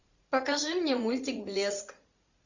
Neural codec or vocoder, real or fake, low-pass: vocoder, 44.1 kHz, 80 mel bands, Vocos; fake; 7.2 kHz